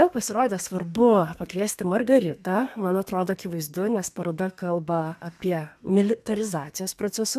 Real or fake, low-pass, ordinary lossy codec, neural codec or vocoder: fake; 14.4 kHz; AAC, 96 kbps; codec, 32 kHz, 1.9 kbps, SNAC